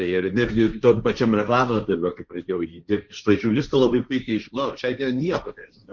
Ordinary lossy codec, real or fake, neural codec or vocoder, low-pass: Opus, 64 kbps; fake; codec, 16 kHz, 1.1 kbps, Voila-Tokenizer; 7.2 kHz